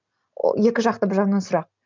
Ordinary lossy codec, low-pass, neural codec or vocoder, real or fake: MP3, 64 kbps; 7.2 kHz; none; real